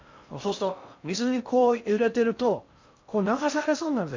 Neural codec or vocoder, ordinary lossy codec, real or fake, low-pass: codec, 16 kHz in and 24 kHz out, 0.6 kbps, FocalCodec, streaming, 2048 codes; MP3, 64 kbps; fake; 7.2 kHz